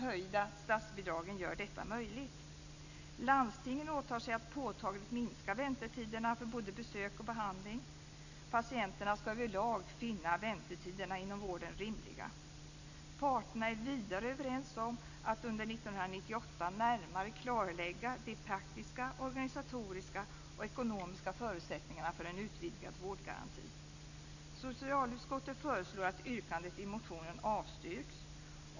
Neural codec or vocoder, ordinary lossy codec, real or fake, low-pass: none; none; real; 7.2 kHz